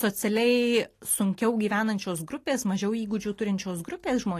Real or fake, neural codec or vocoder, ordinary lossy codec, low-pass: real; none; AAC, 48 kbps; 14.4 kHz